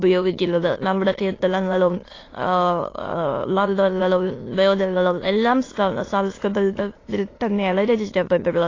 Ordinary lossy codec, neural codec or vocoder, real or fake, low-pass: AAC, 32 kbps; autoencoder, 22.05 kHz, a latent of 192 numbers a frame, VITS, trained on many speakers; fake; 7.2 kHz